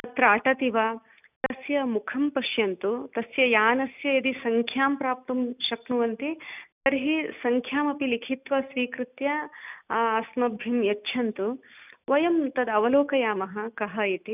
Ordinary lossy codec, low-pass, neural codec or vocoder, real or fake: none; 3.6 kHz; none; real